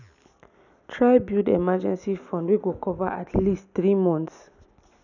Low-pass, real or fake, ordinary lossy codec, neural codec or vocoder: 7.2 kHz; real; none; none